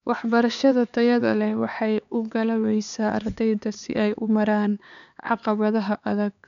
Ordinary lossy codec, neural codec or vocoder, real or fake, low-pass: none; codec, 16 kHz, 4 kbps, X-Codec, HuBERT features, trained on LibriSpeech; fake; 7.2 kHz